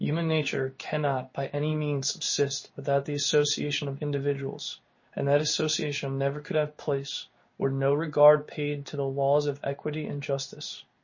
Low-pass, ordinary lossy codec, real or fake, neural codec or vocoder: 7.2 kHz; MP3, 32 kbps; fake; codec, 16 kHz in and 24 kHz out, 1 kbps, XY-Tokenizer